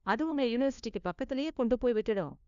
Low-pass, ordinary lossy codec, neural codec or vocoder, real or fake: 7.2 kHz; none; codec, 16 kHz, 1 kbps, FunCodec, trained on LibriTTS, 50 frames a second; fake